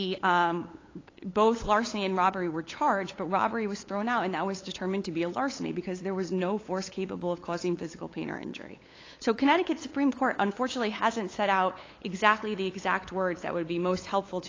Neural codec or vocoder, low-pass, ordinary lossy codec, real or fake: codec, 16 kHz, 8 kbps, FunCodec, trained on LibriTTS, 25 frames a second; 7.2 kHz; AAC, 32 kbps; fake